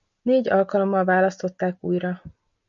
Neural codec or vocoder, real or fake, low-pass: none; real; 7.2 kHz